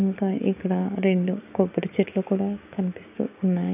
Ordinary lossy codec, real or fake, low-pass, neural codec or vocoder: none; fake; 3.6 kHz; codec, 16 kHz, 6 kbps, DAC